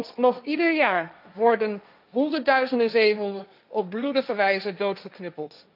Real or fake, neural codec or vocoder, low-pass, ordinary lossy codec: fake; codec, 16 kHz, 1.1 kbps, Voila-Tokenizer; 5.4 kHz; none